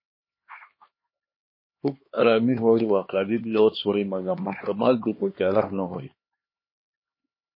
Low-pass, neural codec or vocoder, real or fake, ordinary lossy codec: 5.4 kHz; codec, 16 kHz, 2 kbps, X-Codec, HuBERT features, trained on LibriSpeech; fake; MP3, 24 kbps